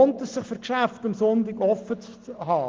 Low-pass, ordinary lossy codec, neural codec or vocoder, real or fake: 7.2 kHz; Opus, 16 kbps; none; real